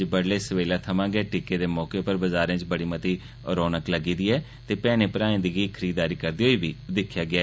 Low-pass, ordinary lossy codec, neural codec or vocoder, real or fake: none; none; none; real